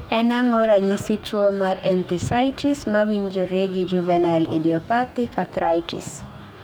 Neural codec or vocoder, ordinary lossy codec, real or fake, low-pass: codec, 44.1 kHz, 2.6 kbps, DAC; none; fake; none